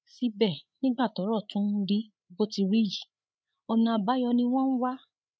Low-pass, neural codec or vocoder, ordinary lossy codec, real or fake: none; codec, 16 kHz, 8 kbps, FreqCodec, larger model; none; fake